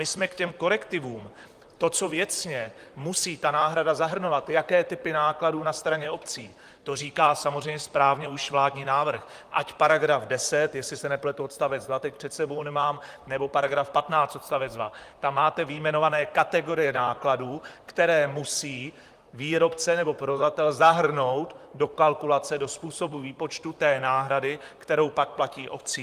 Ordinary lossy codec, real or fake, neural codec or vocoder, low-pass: Opus, 32 kbps; fake; vocoder, 44.1 kHz, 128 mel bands, Pupu-Vocoder; 14.4 kHz